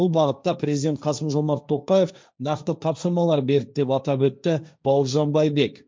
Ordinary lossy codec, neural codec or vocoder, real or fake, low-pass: none; codec, 16 kHz, 1.1 kbps, Voila-Tokenizer; fake; none